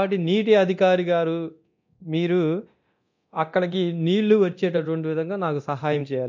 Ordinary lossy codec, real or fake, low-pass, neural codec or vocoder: MP3, 48 kbps; fake; 7.2 kHz; codec, 24 kHz, 0.9 kbps, DualCodec